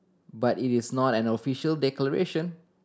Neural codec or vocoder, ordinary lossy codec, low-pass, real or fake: none; none; none; real